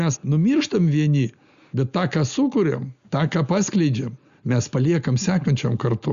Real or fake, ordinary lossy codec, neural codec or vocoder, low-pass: real; Opus, 64 kbps; none; 7.2 kHz